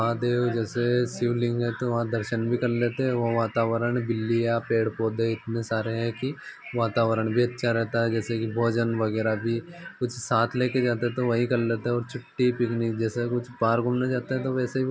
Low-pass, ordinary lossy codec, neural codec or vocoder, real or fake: none; none; none; real